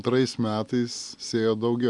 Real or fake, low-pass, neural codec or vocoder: real; 10.8 kHz; none